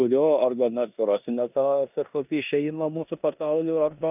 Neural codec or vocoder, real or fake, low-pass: codec, 16 kHz in and 24 kHz out, 0.9 kbps, LongCat-Audio-Codec, four codebook decoder; fake; 3.6 kHz